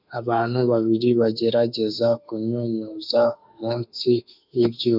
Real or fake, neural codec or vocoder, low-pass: fake; autoencoder, 48 kHz, 32 numbers a frame, DAC-VAE, trained on Japanese speech; 5.4 kHz